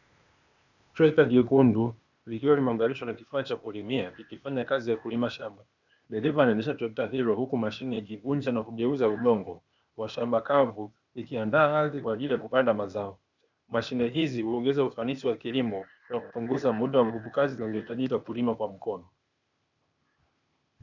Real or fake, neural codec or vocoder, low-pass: fake; codec, 16 kHz, 0.8 kbps, ZipCodec; 7.2 kHz